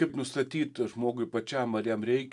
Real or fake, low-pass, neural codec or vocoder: real; 10.8 kHz; none